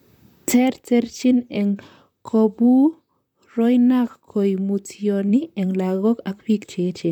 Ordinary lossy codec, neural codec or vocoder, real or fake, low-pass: none; vocoder, 44.1 kHz, 128 mel bands, Pupu-Vocoder; fake; 19.8 kHz